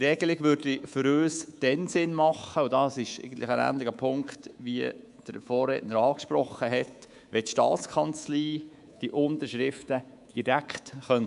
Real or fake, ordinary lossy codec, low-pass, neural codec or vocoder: fake; MP3, 96 kbps; 10.8 kHz; codec, 24 kHz, 3.1 kbps, DualCodec